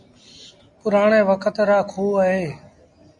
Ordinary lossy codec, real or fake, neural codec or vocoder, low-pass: Opus, 64 kbps; real; none; 10.8 kHz